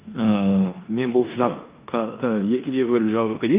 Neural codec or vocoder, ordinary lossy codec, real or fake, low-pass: codec, 16 kHz in and 24 kHz out, 0.9 kbps, LongCat-Audio-Codec, four codebook decoder; Opus, 32 kbps; fake; 3.6 kHz